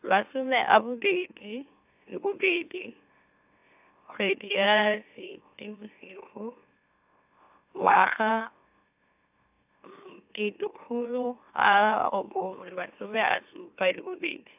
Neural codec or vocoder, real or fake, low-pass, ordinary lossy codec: autoencoder, 44.1 kHz, a latent of 192 numbers a frame, MeloTTS; fake; 3.6 kHz; AAC, 32 kbps